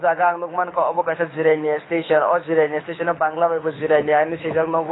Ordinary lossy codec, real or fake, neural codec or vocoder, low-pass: AAC, 16 kbps; fake; codec, 24 kHz, 6 kbps, HILCodec; 7.2 kHz